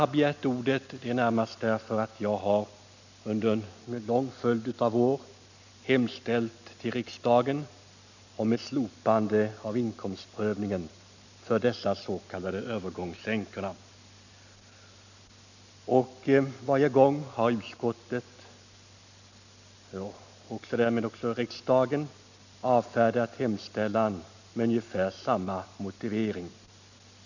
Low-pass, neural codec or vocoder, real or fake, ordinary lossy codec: 7.2 kHz; none; real; none